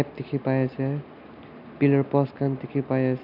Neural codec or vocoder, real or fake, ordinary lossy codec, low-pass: none; real; none; 5.4 kHz